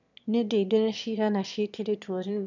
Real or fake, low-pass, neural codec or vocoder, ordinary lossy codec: fake; 7.2 kHz; autoencoder, 22.05 kHz, a latent of 192 numbers a frame, VITS, trained on one speaker; none